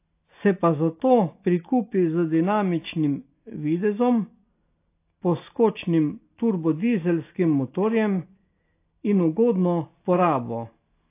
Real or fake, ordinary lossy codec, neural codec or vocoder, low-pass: real; AAC, 24 kbps; none; 3.6 kHz